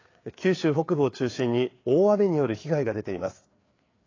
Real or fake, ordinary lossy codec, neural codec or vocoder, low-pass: fake; AAC, 32 kbps; codec, 16 kHz, 4 kbps, FreqCodec, larger model; 7.2 kHz